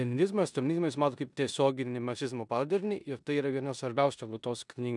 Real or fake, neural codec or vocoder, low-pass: fake; codec, 16 kHz in and 24 kHz out, 0.9 kbps, LongCat-Audio-Codec, four codebook decoder; 10.8 kHz